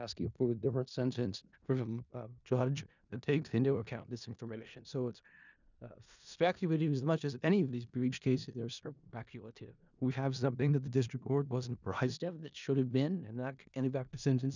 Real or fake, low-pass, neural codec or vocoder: fake; 7.2 kHz; codec, 16 kHz in and 24 kHz out, 0.4 kbps, LongCat-Audio-Codec, four codebook decoder